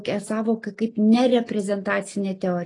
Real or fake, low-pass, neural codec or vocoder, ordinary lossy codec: real; 10.8 kHz; none; AAC, 32 kbps